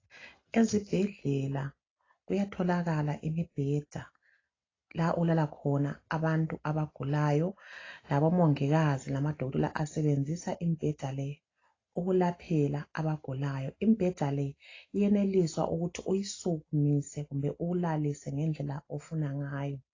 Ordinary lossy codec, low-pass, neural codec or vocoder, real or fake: AAC, 32 kbps; 7.2 kHz; none; real